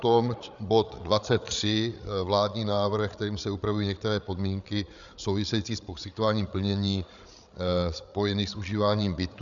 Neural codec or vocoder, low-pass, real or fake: codec, 16 kHz, 8 kbps, FreqCodec, larger model; 7.2 kHz; fake